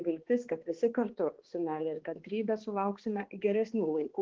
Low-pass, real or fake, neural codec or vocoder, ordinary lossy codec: 7.2 kHz; fake; codec, 16 kHz, 2 kbps, X-Codec, HuBERT features, trained on balanced general audio; Opus, 16 kbps